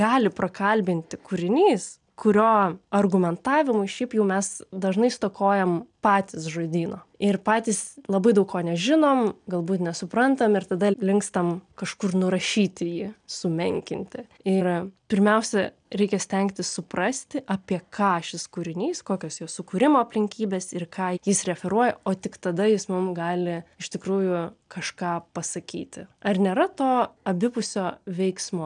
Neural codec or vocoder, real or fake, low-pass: none; real; 9.9 kHz